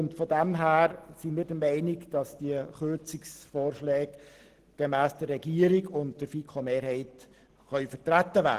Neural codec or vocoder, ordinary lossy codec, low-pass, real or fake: none; Opus, 16 kbps; 14.4 kHz; real